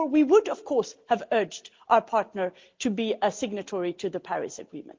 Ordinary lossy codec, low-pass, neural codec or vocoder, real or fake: Opus, 32 kbps; 7.2 kHz; none; real